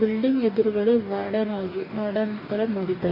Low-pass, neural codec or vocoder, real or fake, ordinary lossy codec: 5.4 kHz; codec, 44.1 kHz, 2.6 kbps, DAC; fake; none